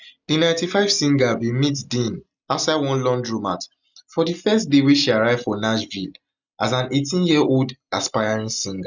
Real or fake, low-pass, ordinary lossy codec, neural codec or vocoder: real; 7.2 kHz; none; none